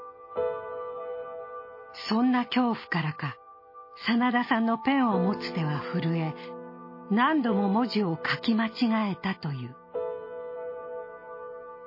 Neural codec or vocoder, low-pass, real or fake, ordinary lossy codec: none; 5.4 kHz; real; MP3, 24 kbps